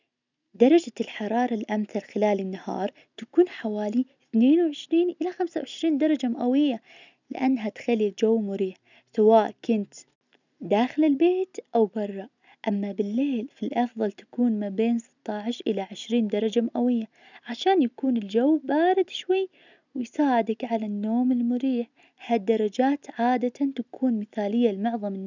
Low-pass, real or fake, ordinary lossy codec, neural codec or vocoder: 7.2 kHz; real; none; none